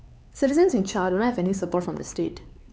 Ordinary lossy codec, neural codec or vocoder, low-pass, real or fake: none; codec, 16 kHz, 4 kbps, X-Codec, HuBERT features, trained on LibriSpeech; none; fake